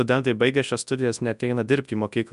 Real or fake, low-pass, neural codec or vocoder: fake; 10.8 kHz; codec, 24 kHz, 0.9 kbps, WavTokenizer, large speech release